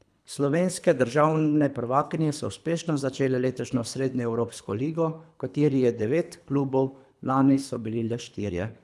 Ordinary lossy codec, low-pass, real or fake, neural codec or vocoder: none; none; fake; codec, 24 kHz, 3 kbps, HILCodec